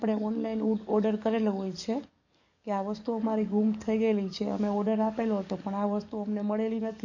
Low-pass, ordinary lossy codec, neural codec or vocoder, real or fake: 7.2 kHz; none; codec, 44.1 kHz, 7.8 kbps, DAC; fake